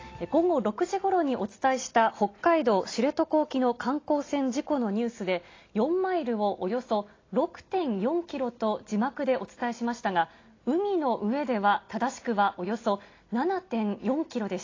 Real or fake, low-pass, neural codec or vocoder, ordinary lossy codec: real; 7.2 kHz; none; AAC, 32 kbps